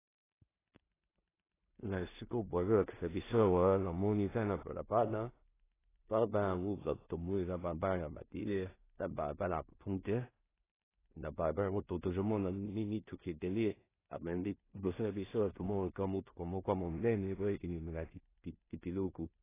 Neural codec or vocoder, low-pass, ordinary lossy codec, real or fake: codec, 16 kHz in and 24 kHz out, 0.4 kbps, LongCat-Audio-Codec, two codebook decoder; 3.6 kHz; AAC, 16 kbps; fake